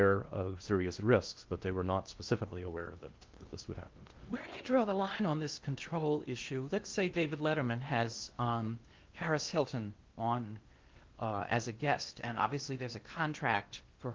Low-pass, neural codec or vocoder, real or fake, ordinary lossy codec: 7.2 kHz; codec, 16 kHz in and 24 kHz out, 0.8 kbps, FocalCodec, streaming, 65536 codes; fake; Opus, 32 kbps